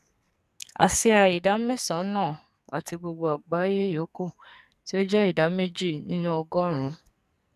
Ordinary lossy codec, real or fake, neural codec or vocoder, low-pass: none; fake; codec, 44.1 kHz, 2.6 kbps, SNAC; 14.4 kHz